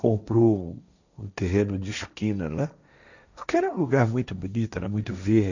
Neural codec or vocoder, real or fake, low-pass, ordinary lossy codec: codec, 16 kHz, 1.1 kbps, Voila-Tokenizer; fake; 7.2 kHz; none